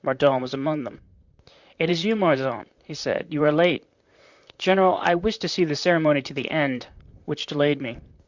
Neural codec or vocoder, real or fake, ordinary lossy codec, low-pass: vocoder, 44.1 kHz, 128 mel bands, Pupu-Vocoder; fake; Opus, 64 kbps; 7.2 kHz